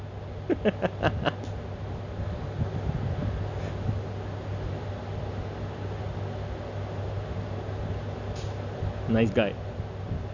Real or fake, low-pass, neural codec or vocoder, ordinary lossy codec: real; 7.2 kHz; none; none